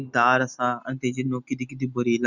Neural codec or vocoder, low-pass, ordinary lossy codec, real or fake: none; 7.2 kHz; none; real